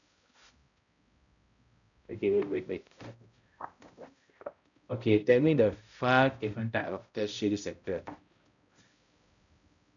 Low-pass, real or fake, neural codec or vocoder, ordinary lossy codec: 7.2 kHz; fake; codec, 16 kHz, 0.5 kbps, X-Codec, HuBERT features, trained on balanced general audio; none